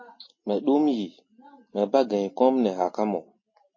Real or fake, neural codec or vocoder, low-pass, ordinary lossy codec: real; none; 7.2 kHz; MP3, 32 kbps